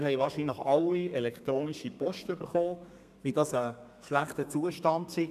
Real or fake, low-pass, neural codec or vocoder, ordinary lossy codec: fake; 14.4 kHz; codec, 32 kHz, 1.9 kbps, SNAC; none